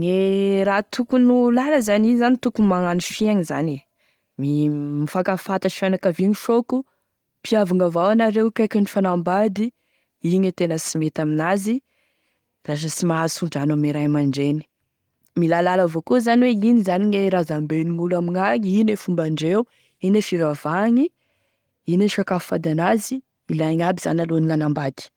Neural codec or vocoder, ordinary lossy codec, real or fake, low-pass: none; Opus, 24 kbps; real; 19.8 kHz